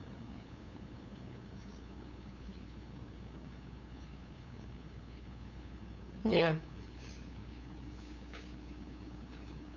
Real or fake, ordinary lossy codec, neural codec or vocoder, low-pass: fake; none; codec, 16 kHz, 2 kbps, FunCodec, trained on LibriTTS, 25 frames a second; 7.2 kHz